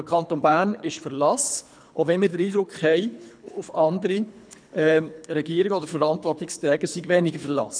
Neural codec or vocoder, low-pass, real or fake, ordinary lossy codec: codec, 24 kHz, 3 kbps, HILCodec; 9.9 kHz; fake; none